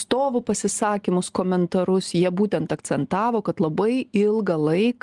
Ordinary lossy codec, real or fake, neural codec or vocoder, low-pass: Opus, 24 kbps; real; none; 10.8 kHz